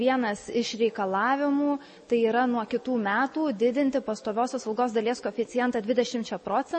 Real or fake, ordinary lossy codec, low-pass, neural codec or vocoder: real; MP3, 32 kbps; 10.8 kHz; none